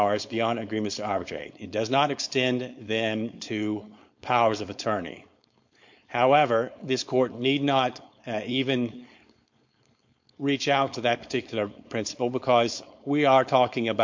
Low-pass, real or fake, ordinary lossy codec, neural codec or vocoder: 7.2 kHz; fake; MP3, 48 kbps; codec, 16 kHz, 4.8 kbps, FACodec